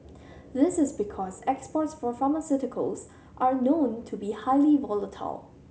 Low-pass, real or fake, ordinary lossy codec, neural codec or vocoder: none; real; none; none